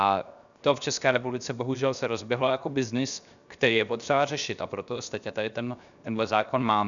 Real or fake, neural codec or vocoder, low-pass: fake; codec, 16 kHz, 0.7 kbps, FocalCodec; 7.2 kHz